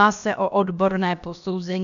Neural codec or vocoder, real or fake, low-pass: codec, 16 kHz, about 1 kbps, DyCAST, with the encoder's durations; fake; 7.2 kHz